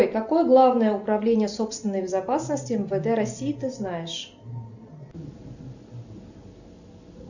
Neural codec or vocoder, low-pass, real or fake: none; 7.2 kHz; real